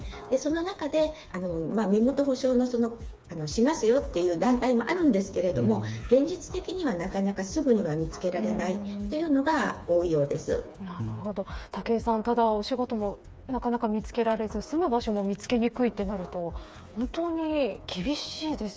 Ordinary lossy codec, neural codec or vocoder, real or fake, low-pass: none; codec, 16 kHz, 4 kbps, FreqCodec, smaller model; fake; none